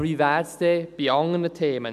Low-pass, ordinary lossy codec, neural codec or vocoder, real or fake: 14.4 kHz; none; none; real